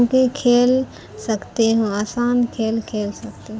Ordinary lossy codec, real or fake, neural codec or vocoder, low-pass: none; real; none; none